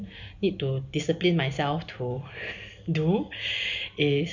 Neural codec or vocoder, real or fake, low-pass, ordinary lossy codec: none; real; 7.2 kHz; none